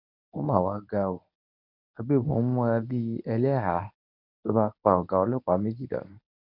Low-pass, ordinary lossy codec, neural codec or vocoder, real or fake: 5.4 kHz; none; codec, 24 kHz, 0.9 kbps, WavTokenizer, medium speech release version 1; fake